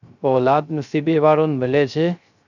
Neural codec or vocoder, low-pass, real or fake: codec, 16 kHz, 0.3 kbps, FocalCodec; 7.2 kHz; fake